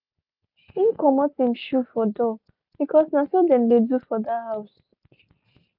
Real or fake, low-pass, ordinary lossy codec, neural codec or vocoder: real; 5.4 kHz; none; none